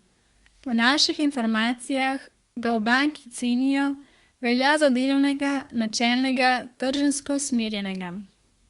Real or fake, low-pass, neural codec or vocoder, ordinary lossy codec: fake; 10.8 kHz; codec, 24 kHz, 1 kbps, SNAC; Opus, 64 kbps